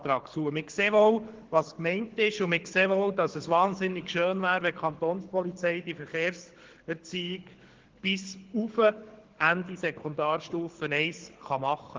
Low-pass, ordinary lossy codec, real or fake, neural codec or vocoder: 7.2 kHz; Opus, 16 kbps; fake; codec, 24 kHz, 6 kbps, HILCodec